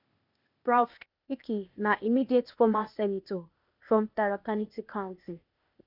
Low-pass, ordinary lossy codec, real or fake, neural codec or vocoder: 5.4 kHz; none; fake; codec, 16 kHz, 0.8 kbps, ZipCodec